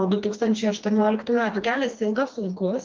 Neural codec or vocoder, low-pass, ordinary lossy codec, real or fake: codec, 16 kHz, 2 kbps, FreqCodec, smaller model; 7.2 kHz; Opus, 32 kbps; fake